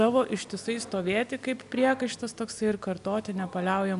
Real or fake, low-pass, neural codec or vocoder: real; 10.8 kHz; none